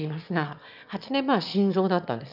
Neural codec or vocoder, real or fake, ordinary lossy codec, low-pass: autoencoder, 22.05 kHz, a latent of 192 numbers a frame, VITS, trained on one speaker; fake; none; 5.4 kHz